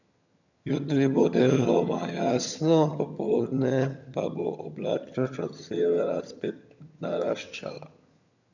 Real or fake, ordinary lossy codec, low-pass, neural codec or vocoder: fake; none; 7.2 kHz; vocoder, 22.05 kHz, 80 mel bands, HiFi-GAN